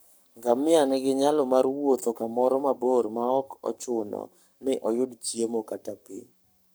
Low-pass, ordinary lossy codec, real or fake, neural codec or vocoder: none; none; fake; codec, 44.1 kHz, 7.8 kbps, Pupu-Codec